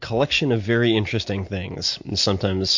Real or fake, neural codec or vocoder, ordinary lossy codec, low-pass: real; none; MP3, 48 kbps; 7.2 kHz